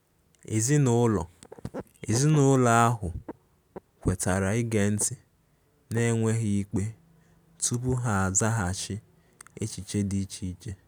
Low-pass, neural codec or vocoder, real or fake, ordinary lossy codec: none; none; real; none